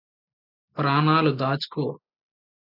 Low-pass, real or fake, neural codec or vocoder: 5.4 kHz; real; none